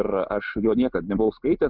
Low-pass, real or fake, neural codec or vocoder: 5.4 kHz; real; none